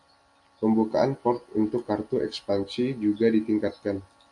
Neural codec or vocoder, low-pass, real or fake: none; 10.8 kHz; real